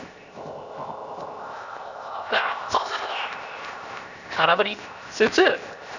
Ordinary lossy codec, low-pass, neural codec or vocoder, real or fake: none; 7.2 kHz; codec, 16 kHz, 0.7 kbps, FocalCodec; fake